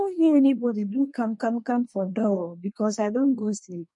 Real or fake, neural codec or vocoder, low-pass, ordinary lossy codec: fake; codec, 24 kHz, 1 kbps, SNAC; 10.8 kHz; MP3, 48 kbps